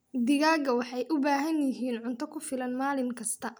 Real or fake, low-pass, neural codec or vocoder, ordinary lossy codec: real; none; none; none